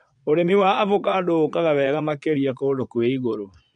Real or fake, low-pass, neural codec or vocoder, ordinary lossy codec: fake; 9.9 kHz; vocoder, 22.05 kHz, 80 mel bands, Vocos; MP3, 64 kbps